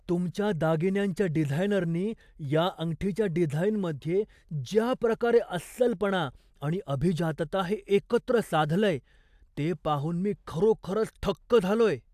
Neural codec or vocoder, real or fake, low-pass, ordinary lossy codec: none; real; 14.4 kHz; AAC, 96 kbps